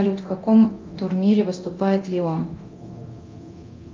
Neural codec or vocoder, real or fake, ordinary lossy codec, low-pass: codec, 24 kHz, 0.9 kbps, DualCodec; fake; Opus, 24 kbps; 7.2 kHz